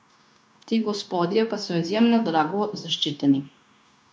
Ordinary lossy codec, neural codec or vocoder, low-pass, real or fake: none; codec, 16 kHz, 0.9 kbps, LongCat-Audio-Codec; none; fake